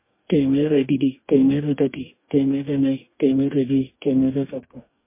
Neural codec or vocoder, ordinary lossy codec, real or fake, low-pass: codec, 44.1 kHz, 2.6 kbps, DAC; MP3, 24 kbps; fake; 3.6 kHz